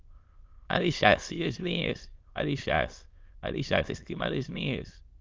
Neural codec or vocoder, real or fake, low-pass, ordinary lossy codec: autoencoder, 22.05 kHz, a latent of 192 numbers a frame, VITS, trained on many speakers; fake; 7.2 kHz; Opus, 24 kbps